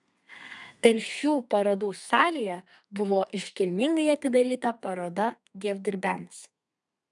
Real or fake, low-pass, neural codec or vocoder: fake; 10.8 kHz; codec, 32 kHz, 1.9 kbps, SNAC